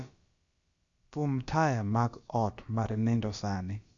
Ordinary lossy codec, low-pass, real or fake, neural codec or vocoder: Opus, 64 kbps; 7.2 kHz; fake; codec, 16 kHz, about 1 kbps, DyCAST, with the encoder's durations